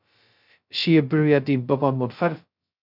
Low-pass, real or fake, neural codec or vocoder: 5.4 kHz; fake; codec, 16 kHz, 0.2 kbps, FocalCodec